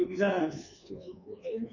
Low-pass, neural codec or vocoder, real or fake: 7.2 kHz; codec, 24 kHz, 1.2 kbps, DualCodec; fake